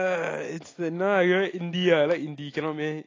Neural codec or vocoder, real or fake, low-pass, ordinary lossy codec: none; real; 7.2 kHz; AAC, 32 kbps